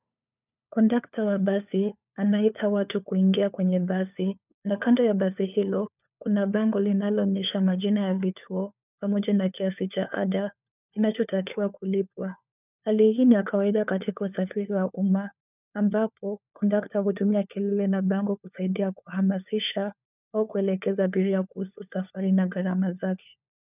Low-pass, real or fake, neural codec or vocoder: 3.6 kHz; fake; codec, 16 kHz, 4 kbps, FunCodec, trained on LibriTTS, 50 frames a second